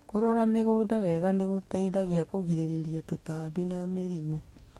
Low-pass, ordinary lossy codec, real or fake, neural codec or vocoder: 19.8 kHz; MP3, 64 kbps; fake; codec, 44.1 kHz, 2.6 kbps, DAC